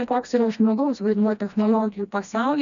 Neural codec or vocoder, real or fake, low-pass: codec, 16 kHz, 1 kbps, FreqCodec, smaller model; fake; 7.2 kHz